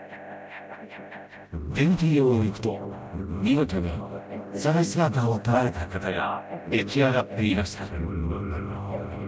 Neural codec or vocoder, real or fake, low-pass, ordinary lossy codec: codec, 16 kHz, 0.5 kbps, FreqCodec, smaller model; fake; none; none